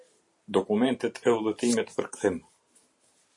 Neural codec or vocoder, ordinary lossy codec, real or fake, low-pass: vocoder, 44.1 kHz, 128 mel bands every 512 samples, BigVGAN v2; MP3, 48 kbps; fake; 10.8 kHz